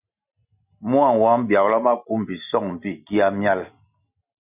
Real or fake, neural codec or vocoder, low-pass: real; none; 3.6 kHz